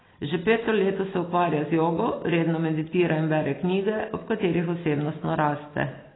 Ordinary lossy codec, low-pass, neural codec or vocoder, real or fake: AAC, 16 kbps; 7.2 kHz; none; real